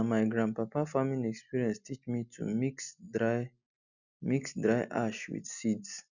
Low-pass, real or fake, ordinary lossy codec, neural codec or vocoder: 7.2 kHz; real; none; none